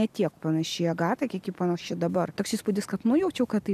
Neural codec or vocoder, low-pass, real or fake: none; 14.4 kHz; real